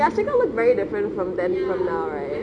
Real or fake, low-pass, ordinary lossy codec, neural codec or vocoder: real; 9.9 kHz; none; none